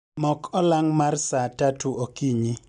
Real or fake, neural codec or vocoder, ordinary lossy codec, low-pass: real; none; none; 10.8 kHz